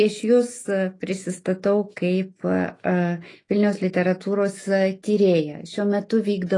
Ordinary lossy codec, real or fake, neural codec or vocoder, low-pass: AAC, 32 kbps; fake; autoencoder, 48 kHz, 128 numbers a frame, DAC-VAE, trained on Japanese speech; 10.8 kHz